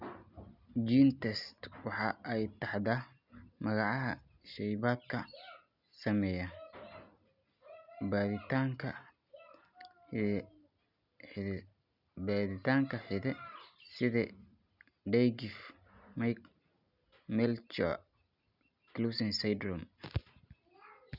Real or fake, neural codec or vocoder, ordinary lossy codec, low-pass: real; none; none; 5.4 kHz